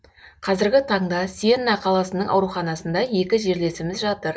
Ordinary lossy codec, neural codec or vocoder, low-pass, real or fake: none; none; none; real